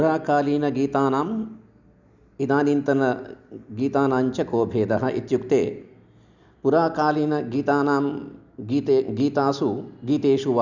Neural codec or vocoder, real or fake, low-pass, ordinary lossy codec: none; real; 7.2 kHz; none